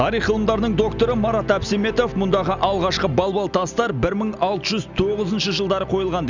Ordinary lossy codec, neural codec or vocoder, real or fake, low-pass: none; none; real; 7.2 kHz